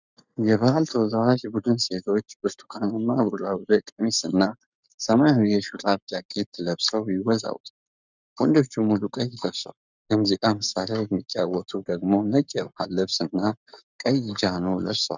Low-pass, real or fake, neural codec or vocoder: 7.2 kHz; fake; codec, 44.1 kHz, 7.8 kbps, Pupu-Codec